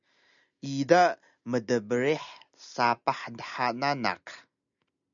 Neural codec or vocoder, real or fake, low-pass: none; real; 7.2 kHz